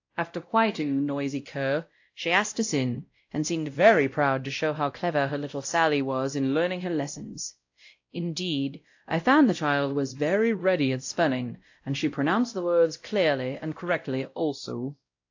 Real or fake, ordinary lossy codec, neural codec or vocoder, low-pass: fake; AAC, 48 kbps; codec, 16 kHz, 0.5 kbps, X-Codec, WavLM features, trained on Multilingual LibriSpeech; 7.2 kHz